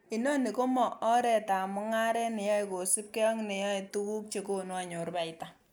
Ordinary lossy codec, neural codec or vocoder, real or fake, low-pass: none; none; real; none